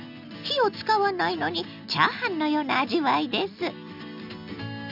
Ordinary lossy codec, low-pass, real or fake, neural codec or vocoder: none; 5.4 kHz; real; none